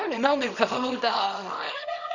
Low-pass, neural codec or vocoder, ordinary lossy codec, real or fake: 7.2 kHz; codec, 24 kHz, 0.9 kbps, WavTokenizer, small release; none; fake